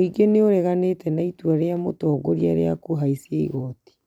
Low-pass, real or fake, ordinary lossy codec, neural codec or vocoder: 19.8 kHz; fake; none; vocoder, 44.1 kHz, 128 mel bands every 256 samples, BigVGAN v2